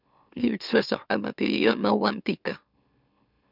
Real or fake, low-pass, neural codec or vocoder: fake; 5.4 kHz; autoencoder, 44.1 kHz, a latent of 192 numbers a frame, MeloTTS